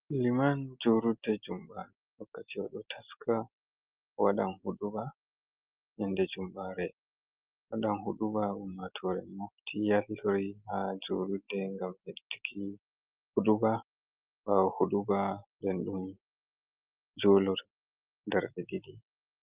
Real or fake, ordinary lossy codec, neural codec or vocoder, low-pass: real; Opus, 24 kbps; none; 3.6 kHz